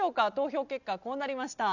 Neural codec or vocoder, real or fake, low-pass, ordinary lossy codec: none; real; 7.2 kHz; none